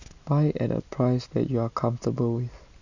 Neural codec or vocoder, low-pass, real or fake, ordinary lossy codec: none; 7.2 kHz; real; none